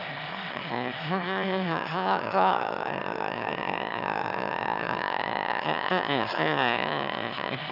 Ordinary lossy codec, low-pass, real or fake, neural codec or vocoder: none; 5.4 kHz; fake; autoencoder, 22.05 kHz, a latent of 192 numbers a frame, VITS, trained on one speaker